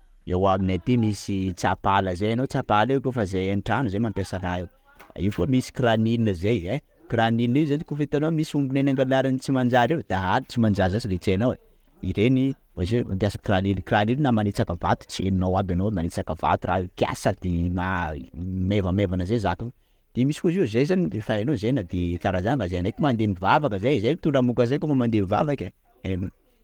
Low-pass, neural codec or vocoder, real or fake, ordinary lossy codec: 19.8 kHz; none; real; Opus, 24 kbps